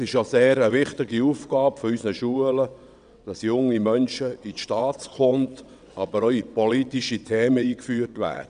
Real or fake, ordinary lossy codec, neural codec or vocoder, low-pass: fake; none; vocoder, 22.05 kHz, 80 mel bands, WaveNeXt; 9.9 kHz